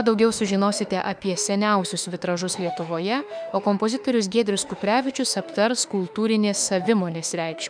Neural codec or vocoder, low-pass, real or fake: autoencoder, 48 kHz, 32 numbers a frame, DAC-VAE, trained on Japanese speech; 9.9 kHz; fake